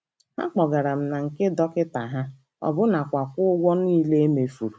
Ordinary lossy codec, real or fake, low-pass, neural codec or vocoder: none; real; none; none